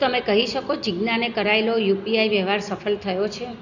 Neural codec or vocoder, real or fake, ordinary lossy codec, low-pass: none; real; none; 7.2 kHz